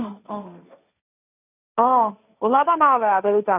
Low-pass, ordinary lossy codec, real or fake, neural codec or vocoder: 3.6 kHz; none; fake; codec, 16 kHz, 1.1 kbps, Voila-Tokenizer